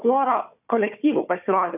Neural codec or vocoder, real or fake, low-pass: codec, 16 kHz, 4 kbps, FunCodec, trained on Chinese and English, 50 frames a second; fake; 3.6 kHz